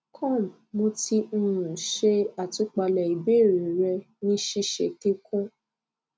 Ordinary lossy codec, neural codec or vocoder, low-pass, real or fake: none; none; none; real